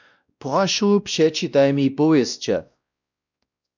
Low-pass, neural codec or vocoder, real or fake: 7.2 kHz; codec, 16 kHz, 1 kbps, X-Codec, WavLM features, trained on Multilingual LibriSpeech; fake